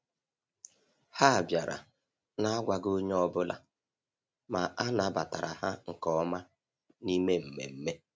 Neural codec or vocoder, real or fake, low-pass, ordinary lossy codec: none; real; none; none